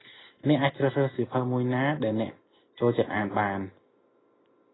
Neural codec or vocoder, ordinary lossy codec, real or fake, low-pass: none; AAC, 16 kbps; real; 7.2 kHz